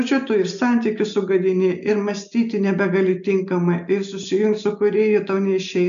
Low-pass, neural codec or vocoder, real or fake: 7.2 kHz; none; real